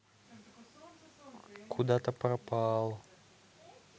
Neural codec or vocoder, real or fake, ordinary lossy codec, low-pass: none; real; none; none